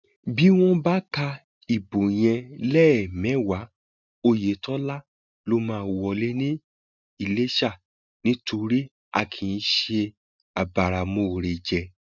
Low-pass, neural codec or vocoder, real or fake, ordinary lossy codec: 7.2 kHz; none; real; none